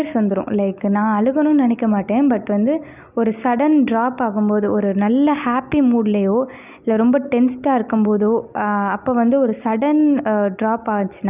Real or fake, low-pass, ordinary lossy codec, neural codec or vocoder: real; 3.6 kHz; none; none